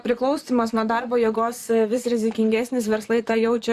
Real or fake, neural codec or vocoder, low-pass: fake; vocoder, 44.1 kHz, 128 mel bands, Pupu-Vocoder; 14.4 kHz